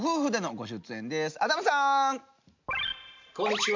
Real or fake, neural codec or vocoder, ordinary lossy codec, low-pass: real; none; MP3, 64 kbps; 7.2 kHz